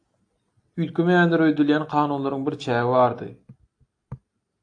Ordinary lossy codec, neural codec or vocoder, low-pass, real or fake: AAC, 48 kbps; none; 9.9 kHz; real